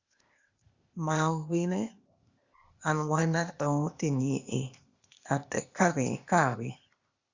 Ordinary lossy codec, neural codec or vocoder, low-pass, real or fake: Opus, 64 kbps; codec, 16 kHz, 0.8 kbps, ZipCodec; 7.2 kHz; fake